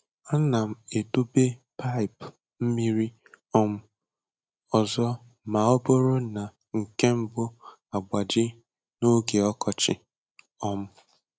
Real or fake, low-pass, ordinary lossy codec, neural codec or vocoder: real; none; none; none